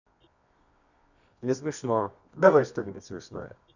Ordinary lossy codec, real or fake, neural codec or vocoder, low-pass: none; fake; codec, 24 kHz, 0.9 kbps, WavTokenizer, medium music audio release; 7.2 kHz